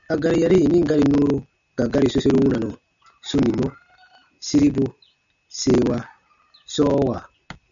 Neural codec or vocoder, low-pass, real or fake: none; 7.2 kHz; real